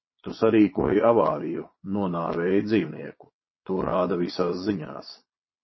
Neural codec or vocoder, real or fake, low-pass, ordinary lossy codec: vocoder, 22.05 kHz, 80 mel bands, Vocos; fake; 7.2 kHz; MP3, 24 kbps